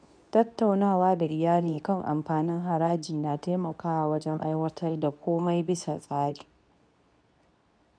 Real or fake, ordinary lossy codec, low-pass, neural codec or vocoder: fake; none; 9.9 kHz; codec, 24 kHz, 0.9 kbps, WavTokenizer, medium speech release version 2